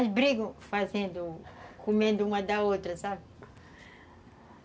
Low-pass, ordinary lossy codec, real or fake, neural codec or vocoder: none; none; real; none